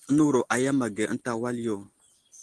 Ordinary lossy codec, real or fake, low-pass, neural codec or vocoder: Opus, 16 kbps; real; 10.8 kHz; none